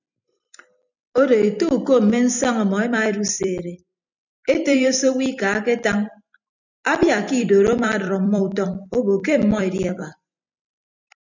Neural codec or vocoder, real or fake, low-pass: vocoder, 44.1 kHz, 128 mel bands every 256 samples, BigVGAN v2; fake; 7.2 kHz